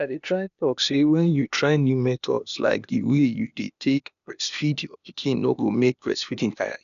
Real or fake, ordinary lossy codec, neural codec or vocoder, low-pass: fake; none; codec, 16 kHz, 0.8 kbps, ZipCodec; 7.2 kHz